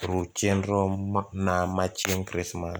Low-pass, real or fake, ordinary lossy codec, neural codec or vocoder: none; real; none; none